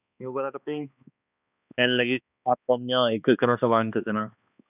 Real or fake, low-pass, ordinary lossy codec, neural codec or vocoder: fake; 3.6 kHz; none; codec, 16 kHz, 2 kbps, X-Codec, HuBERT features, trained on balanced general audio